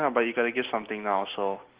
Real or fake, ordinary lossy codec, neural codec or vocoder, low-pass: real; Opus, 16 kbps; none; 3.6 kHz